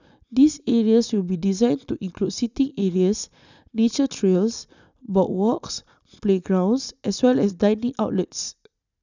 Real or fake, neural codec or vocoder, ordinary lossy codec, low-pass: real; none; none; 7.2 kHz